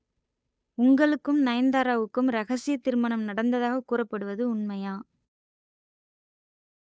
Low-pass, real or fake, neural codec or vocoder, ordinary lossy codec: none; fake; codec, 16 kHz, 8 kbps, FunCodec, trained on Chinese and English, 25 frames a second; none